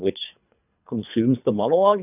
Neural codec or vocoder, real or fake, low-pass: codec, 24 kHz, 3 kbps, HILCodec; fake; 3.6 kHz